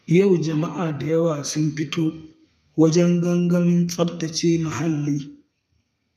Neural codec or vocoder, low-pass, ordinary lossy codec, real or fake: codec, 32 kHz, 1.9 kbps, SNAC; 14.4 kHz; none; fake